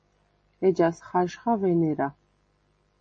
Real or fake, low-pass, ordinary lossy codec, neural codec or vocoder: real; 10.8 kHz; MP3, 32 kbps; none